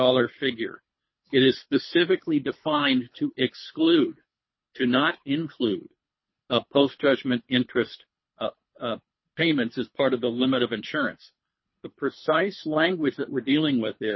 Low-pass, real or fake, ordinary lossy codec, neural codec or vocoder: 7.2 kHz; fake; MP3, 24 kbps; codec, 24 kHz, 3 kbps, HILCodec